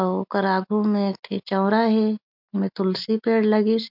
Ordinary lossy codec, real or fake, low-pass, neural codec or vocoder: MP3, 48 kbps; real; 5.4 kHz; none